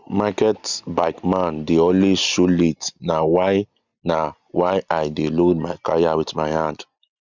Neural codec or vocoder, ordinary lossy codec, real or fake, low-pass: none; none; real; 7.2 kHz